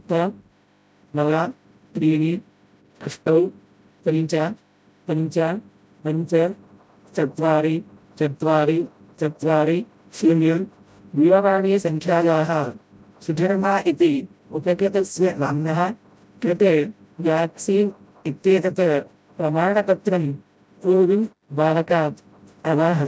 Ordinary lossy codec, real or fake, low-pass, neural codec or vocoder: none; fake; none; codec, 16 kHz, 0.5 kbps, FreqCodec, smaller model